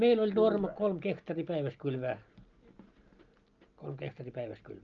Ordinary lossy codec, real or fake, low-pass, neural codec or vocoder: Opus, 24 kbps; real; 7.2 kHz; none